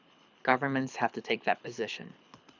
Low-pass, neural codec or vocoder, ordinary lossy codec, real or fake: 7.2 kHz; codec, 24 kHz, 6 kbps, HILCodec; none; fake